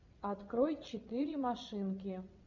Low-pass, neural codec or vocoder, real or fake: 7.2 kHz; vocoder, 22.05 kHz, 80 mel bands, WaveNeXt; fake